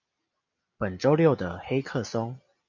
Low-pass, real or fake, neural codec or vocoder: 7.2 kHz; real; none